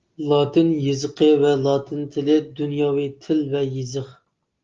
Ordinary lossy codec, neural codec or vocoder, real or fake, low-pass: Opus, 32 kbps; none; real; 7.2 kHz